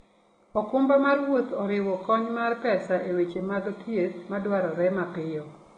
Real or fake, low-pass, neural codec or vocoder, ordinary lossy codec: real; 9.9 kHz; none; AAC, 32 kbps